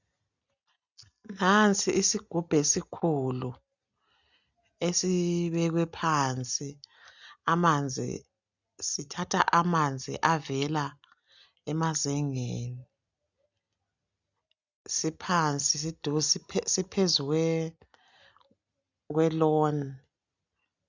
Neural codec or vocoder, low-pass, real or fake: none; 7.2 kHz; real